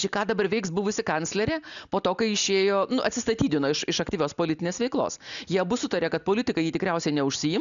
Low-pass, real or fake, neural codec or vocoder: 7.2 kHz; real; none